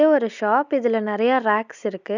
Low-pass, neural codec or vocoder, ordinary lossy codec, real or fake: 7.2 kHz; none; none; real